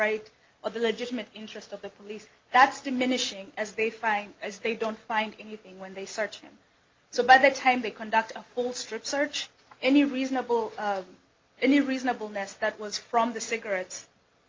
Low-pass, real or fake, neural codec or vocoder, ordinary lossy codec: 7.2 kHz; real; none; Opus, 32 kbps